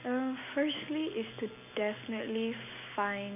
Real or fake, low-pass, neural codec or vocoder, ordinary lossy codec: real; 3.6 kHz; none; none